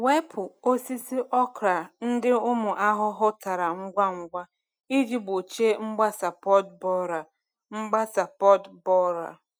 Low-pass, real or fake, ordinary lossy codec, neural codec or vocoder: none; real; none; none